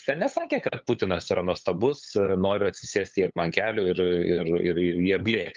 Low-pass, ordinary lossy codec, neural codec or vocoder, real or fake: 7.2 kHz; Opus, 24 kbps; codec, 16 kHz, 8 kbps, FunCodec, trained on LibriTTS, 25 frames a second; fake